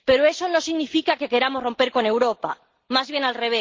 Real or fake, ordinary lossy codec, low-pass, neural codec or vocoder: real; Opus, 16 kbps; 7.2 kHz; none